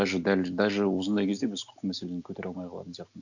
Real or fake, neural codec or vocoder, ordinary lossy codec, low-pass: real; none; none; 7.2 kHz